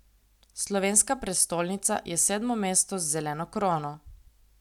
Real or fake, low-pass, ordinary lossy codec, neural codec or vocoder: real; 19.8 kHz; none; none